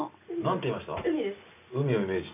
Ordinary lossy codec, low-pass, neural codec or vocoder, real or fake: none; 3.6 kHz; none; real